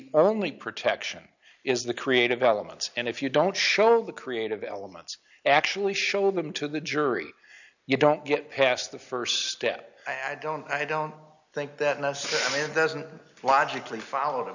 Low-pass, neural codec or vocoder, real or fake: 7.2 kHz; none; real